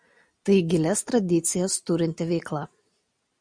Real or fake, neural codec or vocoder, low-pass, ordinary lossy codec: real; none; 9.9 kHz; MP3, 96 kbps